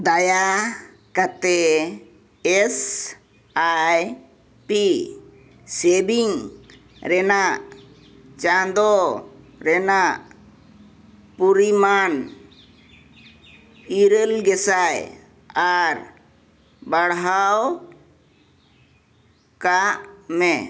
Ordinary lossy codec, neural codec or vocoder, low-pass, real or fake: none; none; none; real